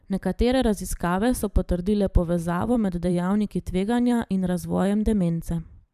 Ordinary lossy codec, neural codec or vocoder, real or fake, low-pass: none; vocoder, 44.1 kHz, 128 mel bands every 512 samples, BigVGAN v2; fake; 14.4 kHz